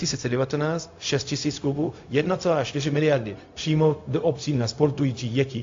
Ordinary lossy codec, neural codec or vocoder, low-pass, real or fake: AAC, 48 kbps; codec, 16 kHz, 0.4 kbps, LongCat-Audio-Codec; 7.2 kHz; fake